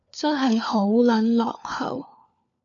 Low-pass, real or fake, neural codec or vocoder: 7.2 kHz; fake; codec, 16 kHz, 4 kbps, FunCodec, trained on LibriTTS, 50 frames a second